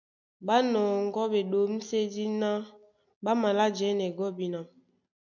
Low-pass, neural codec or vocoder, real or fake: 7.2 kHz; none; real